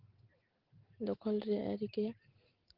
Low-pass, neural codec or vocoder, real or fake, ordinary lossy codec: 5.4 kHz; none; real; Opus, 16 kbps